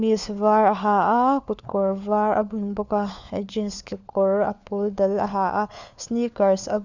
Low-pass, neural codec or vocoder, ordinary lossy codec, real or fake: 7.2 kHz; codec, 16 kHz, 4 kbps, FunCodec, trained on LibriTTS, 50 frames a second; none; fake